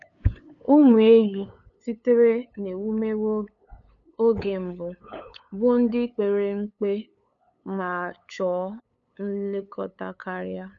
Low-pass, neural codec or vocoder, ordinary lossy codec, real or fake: 7.2 kHz; codec, 16 kHz, 8 kbps, FunCodec, trained on LibriTTS, 25 frames a second; none; fake